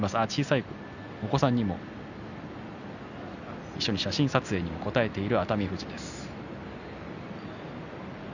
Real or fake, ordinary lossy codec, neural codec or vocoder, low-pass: real; none; none; 7.2 kHz